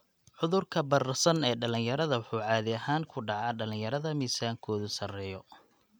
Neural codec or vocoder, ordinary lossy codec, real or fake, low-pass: none; none; real; none